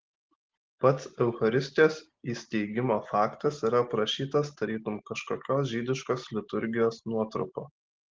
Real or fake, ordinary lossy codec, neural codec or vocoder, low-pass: real; Opus, 16 kbps; none; 7.2 kHz